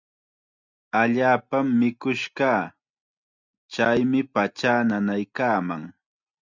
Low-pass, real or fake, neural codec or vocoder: 7.2 kHz; real; none